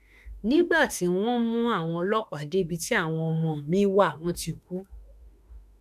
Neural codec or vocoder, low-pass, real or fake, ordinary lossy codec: autoencoder, 48 kHz, 32 numbers a frame, DAC-VAE, trained on Japanese speech; 14.4 kHz; fake; none